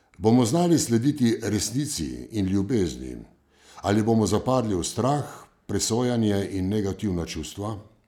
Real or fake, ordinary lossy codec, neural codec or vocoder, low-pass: real; none; none; 19.8 kHz